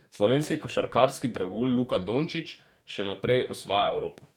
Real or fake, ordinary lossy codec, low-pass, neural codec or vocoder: fake; none; 19.8 kHz; codec, 44.1 kHz, 2.6 kbps, DAC